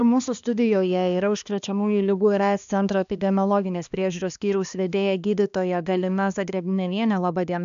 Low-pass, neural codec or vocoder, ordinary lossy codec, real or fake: 7.2 kHz; codec, 16 kHz, 2 kbps, X-Codec, HuBERT features, trained on balanced general audio; MP3, 96 kbps; fake